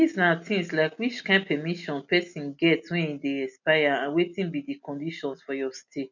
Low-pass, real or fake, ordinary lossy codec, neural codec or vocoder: 7.2 kHz; real; none; none